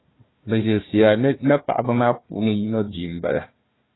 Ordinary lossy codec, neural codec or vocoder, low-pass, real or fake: AAC, 16 kbps; codec, 16 kHz, 1 kbps, FunCodec, trained on Chinese and English, 50 frames a second; 7.2 kHz; fake